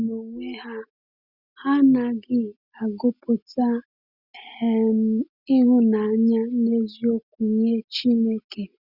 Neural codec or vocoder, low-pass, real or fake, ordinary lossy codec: none; 5.4 kHz; real; Opus, 64 kbps